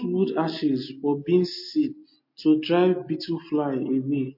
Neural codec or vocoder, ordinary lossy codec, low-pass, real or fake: none; MP3, 32 kbps; 5.4 kHz; real